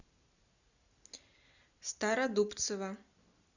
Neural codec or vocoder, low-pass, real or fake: none; 7.2 kHz; real